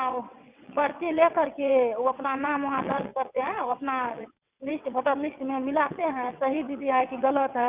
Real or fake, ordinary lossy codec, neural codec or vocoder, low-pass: fake; Opus, 64 kbps; vocoder, 22.05 kHz, 80 mel bands, WaveNeXt; 3.6 kHz